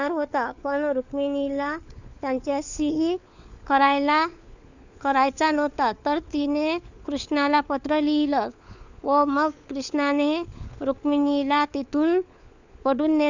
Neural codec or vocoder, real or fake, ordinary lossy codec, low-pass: codec, 16 kHz, 4 kbps, FunCodec, trained on LibriTTS, 50 frames a second; fake; none; 7.2 kHz